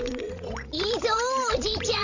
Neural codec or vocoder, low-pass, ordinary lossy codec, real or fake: codec, 16 kHz, 16 kbps, FreqCodec, larger model; 7.2 kHz; none; fake